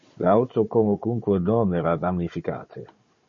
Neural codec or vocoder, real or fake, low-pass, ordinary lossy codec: codec, 16 kHz, 16 kbps, FunCodec, trained on Chinese and English, 50 frames a second; fake; 7.2 kHz; MP3, 32 kbps